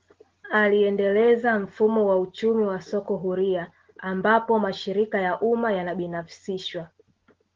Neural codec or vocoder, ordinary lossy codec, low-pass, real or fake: none; Opus, 16 kbps; 7.2 kHz; real